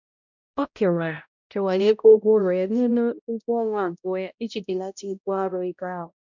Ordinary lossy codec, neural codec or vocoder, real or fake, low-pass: none; codec, 16 kHz, 0.5 kbps, X-Codec, HuBERT features, trained on balanced general audio; fake; 7.2 kHz